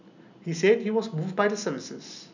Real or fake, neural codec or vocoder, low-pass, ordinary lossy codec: real; none; 7.2 kHz; none